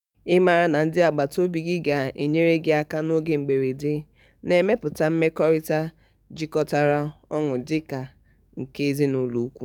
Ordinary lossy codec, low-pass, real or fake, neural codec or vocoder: none; 19.8 kHz; fake; codec, 44.1 kHz, 7.8 kbps, DAC